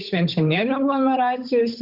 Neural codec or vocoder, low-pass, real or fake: codec, 16 kHz, 8 kbps, FunCodec, trained on Chinese and English, 25 frames a second; 5.4 kHz; fake